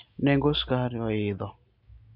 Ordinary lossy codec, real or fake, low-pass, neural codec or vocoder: MP3, 48 kbps; real; 5.4 kHz; none